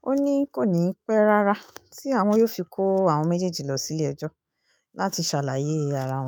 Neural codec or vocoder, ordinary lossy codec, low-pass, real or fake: autoencoder, 48 kHz, 128 numbers a frame, DAC-VAE, trained on Japanese speech; none; none; fake